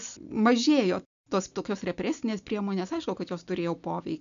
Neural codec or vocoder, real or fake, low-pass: none; real; 7.2 kHz